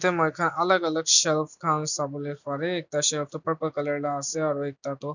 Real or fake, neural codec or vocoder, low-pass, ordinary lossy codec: real; none; 7.2 kHz; none